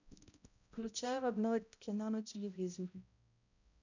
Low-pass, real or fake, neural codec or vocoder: 7.2 kHz; fake; codec, 16 kHz, 0.5 kbps, X-Codec, HuBERT features, trained on balanced general audio